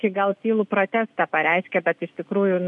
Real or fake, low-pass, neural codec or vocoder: real; 10.8 kHz; none